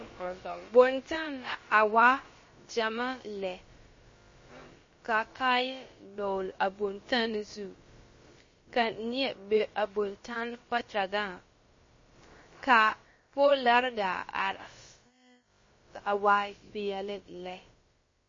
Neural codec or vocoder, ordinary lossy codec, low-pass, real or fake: codec, 16 kHz, about 1 kbps, DyCAST, with the encoder's durations; MP3, 32 kbps; 7.2 kHz; fake